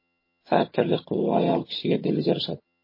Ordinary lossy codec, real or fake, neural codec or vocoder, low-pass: MP3, 24 kbps; fake; vocoder, 22.05 kHz, 80 mel bands, HiFi-GAN; 5.4 kHz